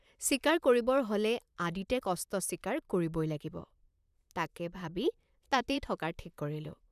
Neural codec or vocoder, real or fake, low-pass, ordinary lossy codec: vocoder, 44.1 kHz, 128 mel bands, Pupu-Vocoder; fake; 14.4 kHz; none